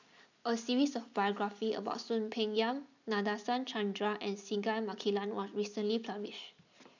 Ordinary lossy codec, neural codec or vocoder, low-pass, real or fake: none; none; 7.2 kHz; real